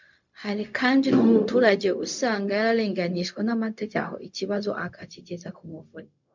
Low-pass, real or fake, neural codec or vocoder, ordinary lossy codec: 7.2 kHz; fake; codec, 16 kHz, 0.4 kbps, LongCat-Audio-Codec; MP3, 64 kbps